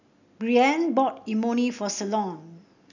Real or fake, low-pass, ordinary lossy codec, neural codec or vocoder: real; 7.2 kHz; none; none